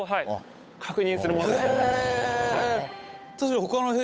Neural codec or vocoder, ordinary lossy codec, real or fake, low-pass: codec, 16 kHz, 8 kbps, FunCodec, trained on Chinese and English, 25 frames a second; none; fake; none